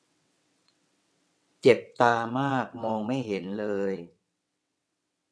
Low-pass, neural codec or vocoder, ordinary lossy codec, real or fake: none; vocoder, 22.05 kHz, 80 mel bands, WaveNeXt; none; fake